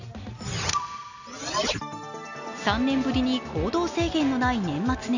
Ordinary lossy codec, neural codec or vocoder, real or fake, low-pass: none; none; real; 7.2 kHz